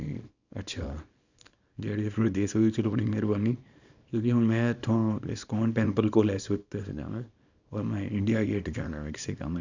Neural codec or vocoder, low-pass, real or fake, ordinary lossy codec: codec, 24 kHz, 0.9 kbps, WavTokenizer, small release; 7.2 kHz; fake; none